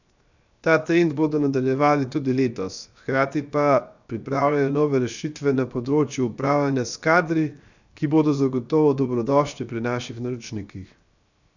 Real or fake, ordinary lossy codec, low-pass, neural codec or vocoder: fake; none; 7.2 kHz; codec, 16 kHz, 0.7 kbps, FocalCodec